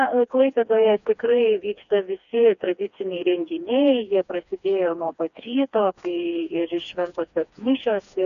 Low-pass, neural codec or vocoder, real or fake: 7.2 kHz; codec, 16 kHz, 2 kbps, FreqCodec, smaller model; fake